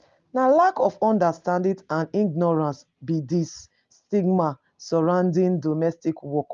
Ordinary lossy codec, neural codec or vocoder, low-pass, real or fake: Opus, 32 kbps; none; 7.2 kHz; real